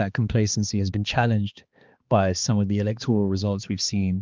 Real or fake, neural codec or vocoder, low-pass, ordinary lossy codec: fake; codec, 16 kHz, 2 kbps, X-Codec, HuBERT features, trained on balanced general audio; 7.2 kHz; Opus, 32 kbps